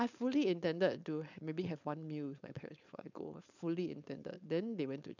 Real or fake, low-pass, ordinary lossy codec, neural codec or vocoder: fake; 7.2 kHz; none; codec, 16 kHz, 4.8 kbps, FACodec